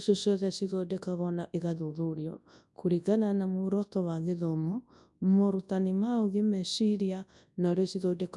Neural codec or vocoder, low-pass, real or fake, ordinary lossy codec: codec, 24 kHz, 0.9 kbps, WavTokenizer, large speech release; 10.8 kHz; fake; none